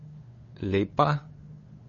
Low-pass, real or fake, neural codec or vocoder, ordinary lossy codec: 7.2 kHz; fake; codec, 16 kHz, 8 kbps, FunCodec, trained on LibriTTS, 25 frames a second; MP3, 32 kbps